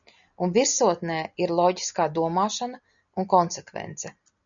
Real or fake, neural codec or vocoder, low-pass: real; none; 7.2 kHz